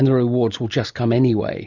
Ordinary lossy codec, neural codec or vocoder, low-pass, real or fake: Opus, 64 kbps; none; 7.2 kHz; real